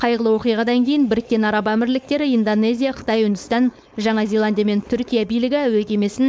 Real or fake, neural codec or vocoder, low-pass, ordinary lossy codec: fake; codec, 16 kHz, 4.8 kbps, FACodec; none; none